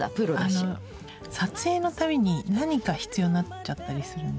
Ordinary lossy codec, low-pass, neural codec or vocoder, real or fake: none; none; none; real